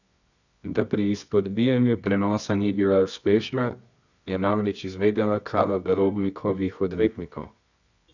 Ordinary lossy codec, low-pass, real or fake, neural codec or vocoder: none; 7.2 kHz; fake; codec, 24 kHz, 0.9 kbps, WavTokenizer, medium music audio release